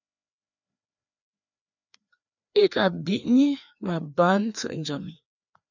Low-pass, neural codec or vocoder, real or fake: 7.2 kHz; codec, 16 kHz, 2 kbps, FreqCodec, larger model; fake